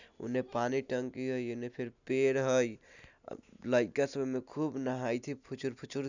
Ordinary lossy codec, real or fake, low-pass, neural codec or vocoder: none; real; 7.2 kHz; none